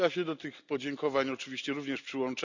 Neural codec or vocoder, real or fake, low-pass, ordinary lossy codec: none; real; 7.2 kHz; none